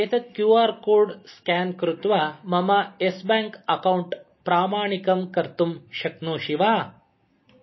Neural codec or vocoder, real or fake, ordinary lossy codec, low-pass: none; real; MP3, 24 kbps; 7.2 kHz